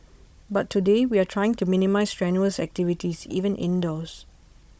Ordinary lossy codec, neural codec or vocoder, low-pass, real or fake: none; codec, 16 kHz, 16 kbps, FunCodec, trained on Chinese and English, 50 frames a second; none; fake